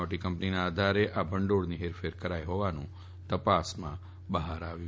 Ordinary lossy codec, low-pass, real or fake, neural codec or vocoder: none; none; real; none